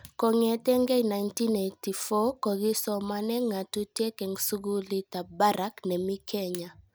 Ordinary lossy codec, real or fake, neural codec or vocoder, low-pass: none; real; none; none